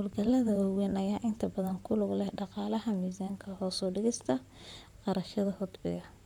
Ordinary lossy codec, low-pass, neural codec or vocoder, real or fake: none; 19.8 kHz; vocoder, 44.1 kHz, 128 mel bands, Pupu-Vocoder; fake